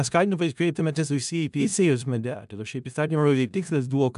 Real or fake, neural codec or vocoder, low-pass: fake; codec, 16 kHz in and 24 kHz out, 0.4 kbps, LongCat-Audio-Codec, four codebook decoder; 10.8 kHz